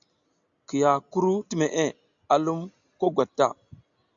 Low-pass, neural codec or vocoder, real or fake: 7.2 kHz; none; real